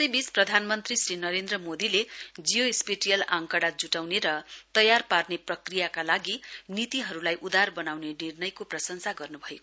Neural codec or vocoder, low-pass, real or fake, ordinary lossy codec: none; none; real; none